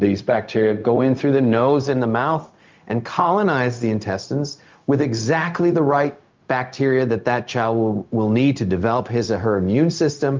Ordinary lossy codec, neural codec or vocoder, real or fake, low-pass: Opus, 24 kbps; codec, 16 kHz, 0.4 kbps, LongCat-Audio-Codec; fake; 7.2 kHz